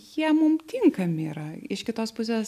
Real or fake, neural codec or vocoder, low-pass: real; none; 14.4 kHz